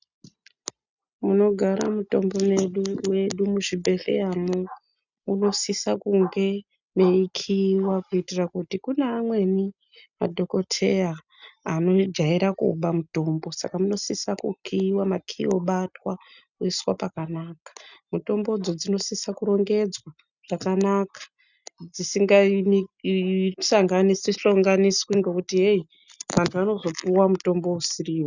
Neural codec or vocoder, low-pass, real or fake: none; 7.2 kHz; real